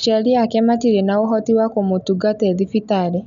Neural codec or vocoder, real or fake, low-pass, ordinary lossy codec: none; real; 7.2 kHz; none